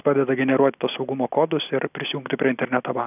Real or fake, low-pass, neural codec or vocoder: real; 3.6 kHz; none